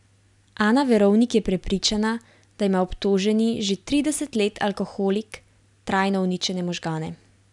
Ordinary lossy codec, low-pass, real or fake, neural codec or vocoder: none; 10.8 kHz; real; none